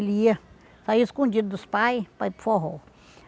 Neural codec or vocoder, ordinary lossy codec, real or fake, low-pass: none; none; real; none